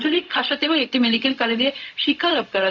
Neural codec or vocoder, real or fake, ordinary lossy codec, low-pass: codec, 16 kHz, 0.4 kbps, LongCat-Audio-Codec; fake; none; 7.2 kHz